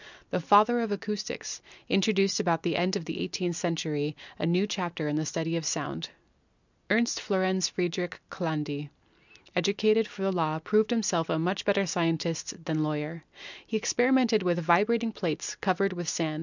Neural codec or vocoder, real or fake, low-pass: none; real; 7.2 kHz